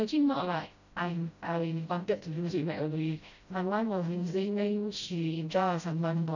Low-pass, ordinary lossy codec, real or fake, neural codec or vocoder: 7.2 kHz; none; fake; codec, 16 kHz, 0.5 kbps, FreqCodec, smaller model